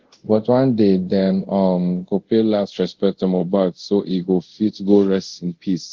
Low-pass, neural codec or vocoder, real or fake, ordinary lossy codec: 7.2 kHz; codec, 24 kHz, 0.5 kbps, DualCodec; fake; Opus, 16 kbps